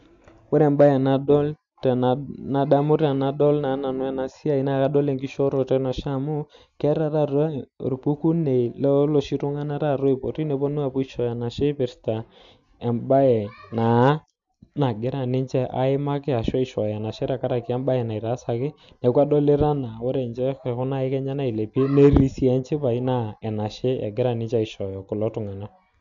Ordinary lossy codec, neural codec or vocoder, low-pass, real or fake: MP3, 64 kbps; none; 7.2 kHz; real